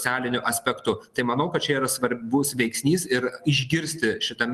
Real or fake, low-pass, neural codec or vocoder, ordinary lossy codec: real; 14.4 kHz; none; Opus, 24 kbps